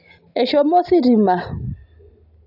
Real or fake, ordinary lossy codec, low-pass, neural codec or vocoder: real; none; 5.4 kHz; none